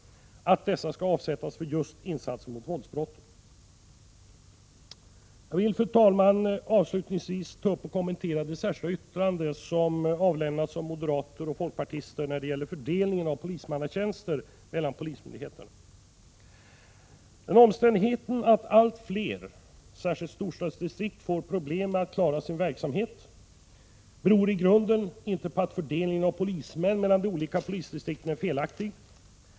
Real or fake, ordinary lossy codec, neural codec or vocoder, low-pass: real; none; none; none